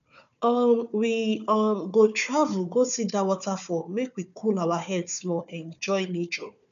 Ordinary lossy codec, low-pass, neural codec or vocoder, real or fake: none; 7.2 kHz; codec, 16 kHz, 4 kbps, FunCodec, trained on Chinese and English, 50 frames a second; fake